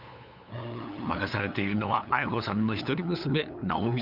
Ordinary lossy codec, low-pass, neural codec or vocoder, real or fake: none; 5.4 kHz; codec, 16 kHz, 8 kbps, FunCodec, trained on LibriTTS, 25 frames a second; fake